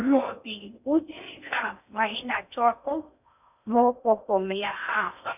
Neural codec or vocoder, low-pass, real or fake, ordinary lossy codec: codec, 16 kHz in and 24 kHz out, 0.6 kbps, FocalCodec, streaming, 4096 codes; 3.6 kHz; fake; none